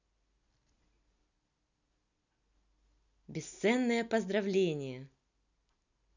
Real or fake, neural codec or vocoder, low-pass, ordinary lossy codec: real; none; 7.2 kHz; none